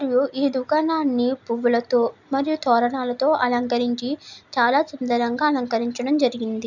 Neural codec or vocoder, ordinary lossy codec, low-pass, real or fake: none; none; 7.2 kHz; real